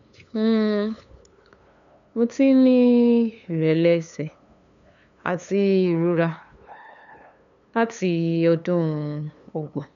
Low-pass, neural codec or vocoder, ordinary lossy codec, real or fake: 7.2 kHz; codec, 16 kHz, 2 kbps, FunCodec, trained on LibriTTS, 25 frames a second; MP3, 96 kbps; fake